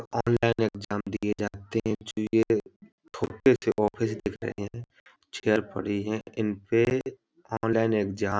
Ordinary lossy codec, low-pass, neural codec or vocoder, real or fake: none; none; none; real